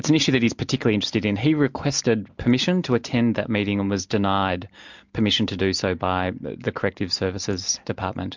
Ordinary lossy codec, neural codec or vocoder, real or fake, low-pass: MP3, 64 kbps; none; real; 7.2 kHz